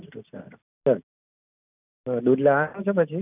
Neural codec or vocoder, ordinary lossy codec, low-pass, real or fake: none; none; 3.6 kHz; real